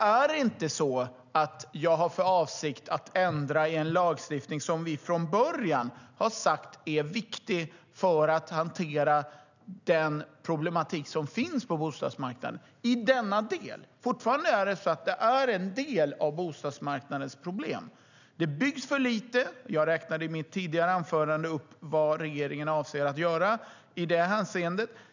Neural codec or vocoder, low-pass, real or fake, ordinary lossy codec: none; 7.2 kHz; real; none